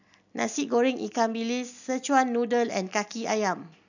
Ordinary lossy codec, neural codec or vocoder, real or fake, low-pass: none; none; real; 7.2 kHz